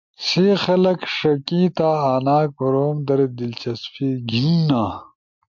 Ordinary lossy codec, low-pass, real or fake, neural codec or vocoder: MP3, 64 kbps; 7.2 kHz; real; none